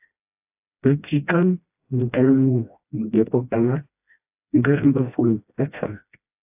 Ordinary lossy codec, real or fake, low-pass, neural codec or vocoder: AAC, 32 kbps; fake; 3.6 kHz; codec, 16 kHz, 1 kbps, FreqCodec, smaller model